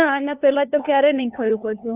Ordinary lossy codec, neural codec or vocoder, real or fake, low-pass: Opus, 64 kbps; codec, 16 kHz, 2 kbps, FunCodec, trained on LibriTTS, 25 frames a second; fake; 3.6 kHz